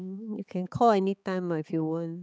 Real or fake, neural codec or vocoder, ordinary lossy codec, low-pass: fake; codec, 16 kHz, 4 kbps, X-Codec, HuBERT features, trained on balanced general audio; none; none